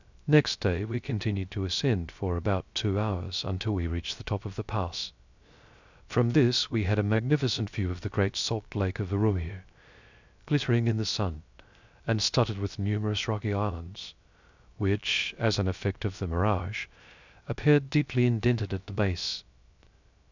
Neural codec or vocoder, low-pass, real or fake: codec, 16 kHz, 0.3 kbps, FocalCodec; 7.2 kHz; fake